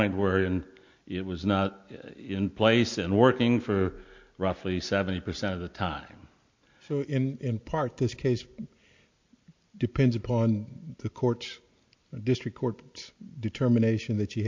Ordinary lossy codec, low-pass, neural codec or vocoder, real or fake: MP3, 48 kbps; 7.2 kHz; none; real